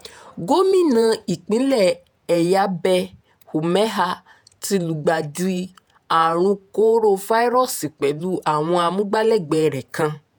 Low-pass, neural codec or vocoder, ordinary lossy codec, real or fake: none; vocoder, 48 kHz, 128 mel bands, Vocos; none; fake